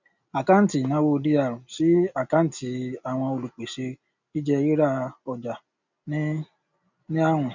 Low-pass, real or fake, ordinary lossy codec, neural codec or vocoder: 7.2 kHz; real; none; none